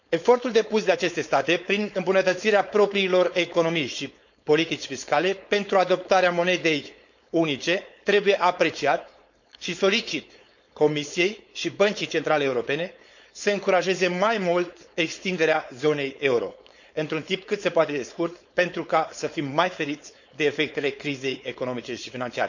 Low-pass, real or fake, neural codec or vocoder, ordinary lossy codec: 7.2 kHz; fake; codec, 16 kHz, 4.8 kbps, FACodec; none